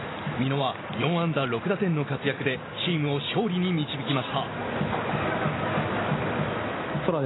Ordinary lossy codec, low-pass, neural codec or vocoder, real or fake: AAC, 16 kbps; 7.2 kHz; none; real